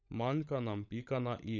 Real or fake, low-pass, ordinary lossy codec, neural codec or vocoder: fake; 7.2 kHz; none; codec, 16 kHz, 16 kbps, FunCodec, trained on LibriTTS, 50 frames a second